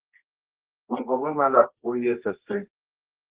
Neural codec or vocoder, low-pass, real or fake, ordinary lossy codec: codec, 24 kHz, 0.9 kbps, WavTokenizer, medium music audio release; 3.6 kHz; fake; Opus, 16 kbps